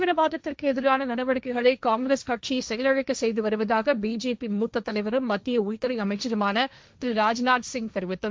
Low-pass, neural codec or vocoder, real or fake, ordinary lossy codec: none; codec, 16 kHz, 1.1 kbps, Voila-Tokenizer; fake; none